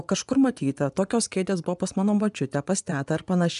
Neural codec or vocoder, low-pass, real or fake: vocoder, 24 kHz, 100 mel bands, Vocos; 10.8 kHz; fake